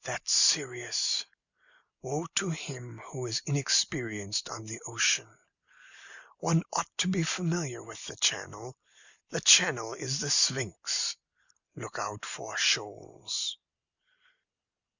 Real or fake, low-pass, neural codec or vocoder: real; 7.2 kHz; none